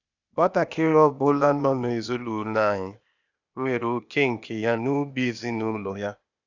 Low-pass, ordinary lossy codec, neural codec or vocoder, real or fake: 7.2 kHz; none; codec, 16 kHz, 0.8 kbps, ZipCodec; fake